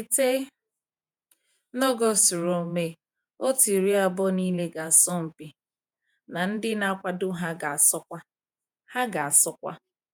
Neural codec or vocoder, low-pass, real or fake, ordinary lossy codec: vocoder, 48 kHz, 128 mel bands, Vocos; none; fake; none